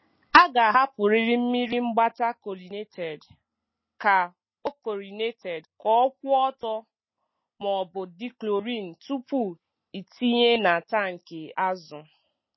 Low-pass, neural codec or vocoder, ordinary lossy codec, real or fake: 7.2 kHz; none; MP3, 24 kbps; real